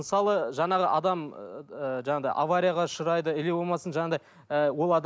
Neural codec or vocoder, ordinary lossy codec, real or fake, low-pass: none; none; real; none